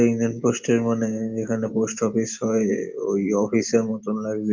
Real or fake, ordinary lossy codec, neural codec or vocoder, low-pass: real; Opus, 32 kbps; none; 7.2 kHz